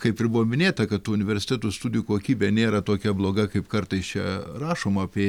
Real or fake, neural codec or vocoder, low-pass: real; none; 19.8 kHz